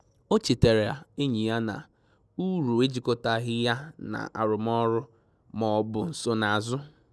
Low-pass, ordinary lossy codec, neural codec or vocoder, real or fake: none; none; none; real